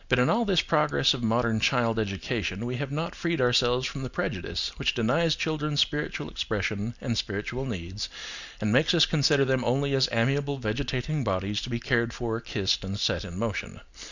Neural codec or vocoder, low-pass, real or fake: none; 7.2 kHz; real